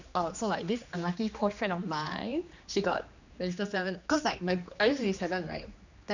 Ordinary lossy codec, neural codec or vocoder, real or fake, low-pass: none; codec, 16 kHz, 2 kbps, X-Codec, HuBERT features, trained on general audio; fake; 7.2 kHz